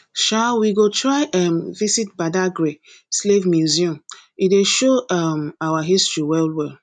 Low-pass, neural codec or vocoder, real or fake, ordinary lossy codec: 9.9 kHz; none; real; none